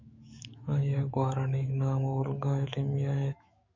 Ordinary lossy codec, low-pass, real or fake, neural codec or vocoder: MP3, 64 kbps; 7.2 kHz; fake; autoencoder, 48 kHz, 128 numbers a frame, DAC-VAE, trained on Japanese speech